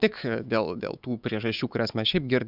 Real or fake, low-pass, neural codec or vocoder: fake; 5.4 kHz; autoencoder, 48 kHz, 128 numbers a frame, DAC-VAE, trained on Japanese speech